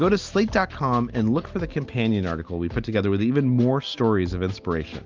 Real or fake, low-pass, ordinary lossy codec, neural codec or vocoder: real; 7.2 kHz; Opus, 24 kbps; none